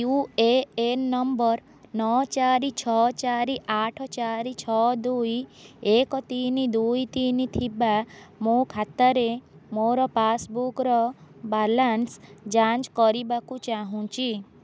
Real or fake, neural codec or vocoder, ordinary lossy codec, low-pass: real; none; none; none